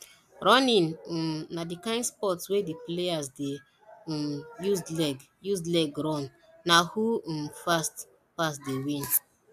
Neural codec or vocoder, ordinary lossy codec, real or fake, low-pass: none; AAC, 96 kbps; real; 14.4 kHz